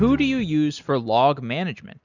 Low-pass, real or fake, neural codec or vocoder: 7.2 kHz; real; none